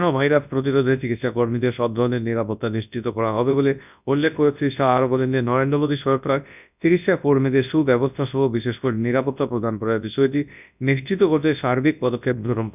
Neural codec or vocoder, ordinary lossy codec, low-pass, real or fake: codec, 24 kHz, 0.9 kbps, WavTokenizer, large speech release; none; 3.6 kHz; fake